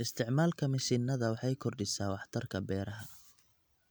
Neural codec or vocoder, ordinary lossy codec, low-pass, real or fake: none; none; none; real